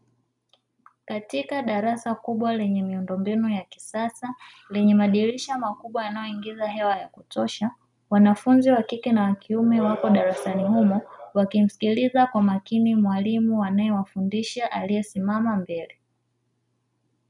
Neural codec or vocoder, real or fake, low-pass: none; real; 10.8 kHz